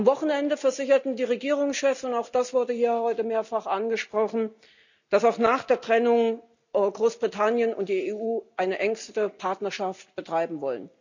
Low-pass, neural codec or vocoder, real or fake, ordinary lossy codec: 7.2 kHz; none; real; none